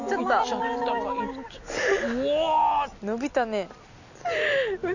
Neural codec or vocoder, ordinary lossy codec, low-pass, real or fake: none; none; 7.2 kHz; real